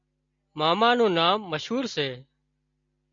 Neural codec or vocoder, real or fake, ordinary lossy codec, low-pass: none; real; MP3, 48 kbps; 7.2 kHz